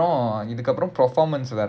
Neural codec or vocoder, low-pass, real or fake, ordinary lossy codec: none; none; real; none